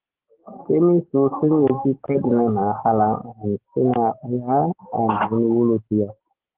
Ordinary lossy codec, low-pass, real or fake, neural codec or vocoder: Opus, 16 kbps; 3.6 kHz; fake; codec, 44.1 kHz, 7.8 kbps, DAC